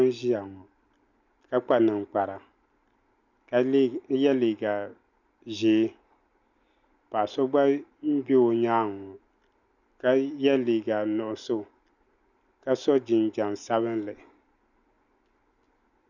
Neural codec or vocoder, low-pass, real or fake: none; 7.2 kHz; real